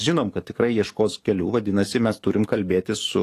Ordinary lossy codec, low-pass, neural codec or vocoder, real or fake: AAC, 48 kbps; 14.4 kHz; codec, 44.1 kHz, 7.8 kbps, DAC; fake